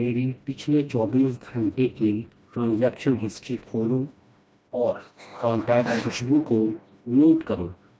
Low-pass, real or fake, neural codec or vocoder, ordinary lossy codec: none; fake; codec, 16 kHz, 1 kbps, FreqCodec, smaller model; none